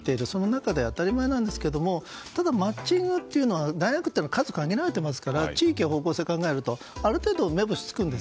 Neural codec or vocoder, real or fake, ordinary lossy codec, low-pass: none; real; none; none